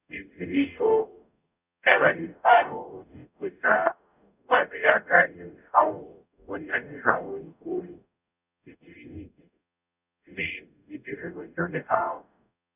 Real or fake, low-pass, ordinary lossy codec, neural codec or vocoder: fake; 3.6 kHz; none; codec, 44.1 kHz, 0.9 kbps, DAC